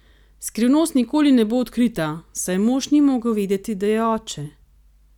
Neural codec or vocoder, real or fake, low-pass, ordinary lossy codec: none; real; 19.8 kHz; none